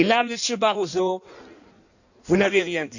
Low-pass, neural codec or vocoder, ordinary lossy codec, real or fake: 7.2 kHz; codec, 16 kHz in and 24 kHz out, 1.1 kbps, FireRedTTS-2 codec; none; fake